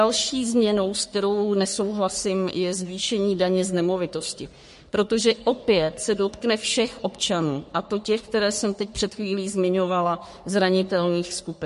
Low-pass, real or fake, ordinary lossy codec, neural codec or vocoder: 14.4 kHz; fake; MP3, 48 kbps; codec, 44.1 kHz, 3.4 kbps, Pupu-Codec